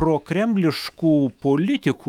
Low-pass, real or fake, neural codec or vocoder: 19.8 kHz; fake; autoencoder, 48 kHz, 128 numbers a frame, DAC-VAE, trained on Japanese speech